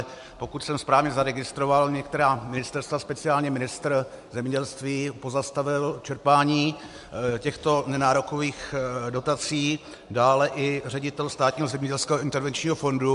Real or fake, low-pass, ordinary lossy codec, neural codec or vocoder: real; 10.8 kHz; MP3, 64 kbps; none